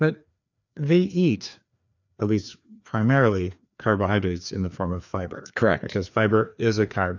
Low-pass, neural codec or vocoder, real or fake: 7.2 kHz; codec, 16 kHz, 2 kbps, FreqCodec, larger model; fake